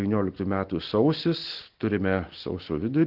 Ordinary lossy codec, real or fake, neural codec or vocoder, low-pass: Opus, 16 kbps; real; none; 5.4 kHz